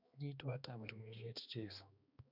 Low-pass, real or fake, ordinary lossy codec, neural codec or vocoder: 5.4 kHz; fake; none; codec, 16 kHz, 2 kbps, X-Codec, HuBERT features, trained on balanced general audio